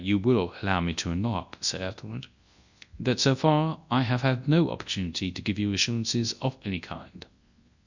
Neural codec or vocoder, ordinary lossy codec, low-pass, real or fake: codec, 24 kHz, 0.9 kbps, WavTokenizer, large speech release; Opus, 64 kbps; 7.2 kHz; fake